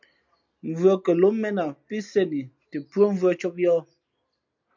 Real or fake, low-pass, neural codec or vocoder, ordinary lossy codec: real; 7.2 kHz; none; MP3, 64 kbps